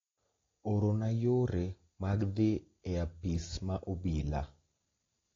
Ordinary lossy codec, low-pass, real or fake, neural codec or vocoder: AAC, 32 kbps; 7.2 kHz; real; none